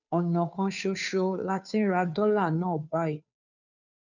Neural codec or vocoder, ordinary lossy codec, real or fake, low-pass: codec, 16 kHz, 2 kbps, FunCodec, trained on Chinese and English, 25 frames a second; none; fake; 7.2 kHz